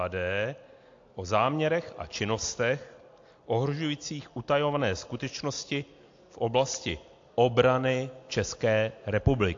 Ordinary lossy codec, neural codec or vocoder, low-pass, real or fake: AAC, 48 kbps; none; 7.2 kHz; real